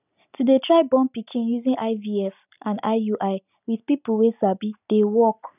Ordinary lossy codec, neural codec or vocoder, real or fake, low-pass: none; none; real; 3.6 kHz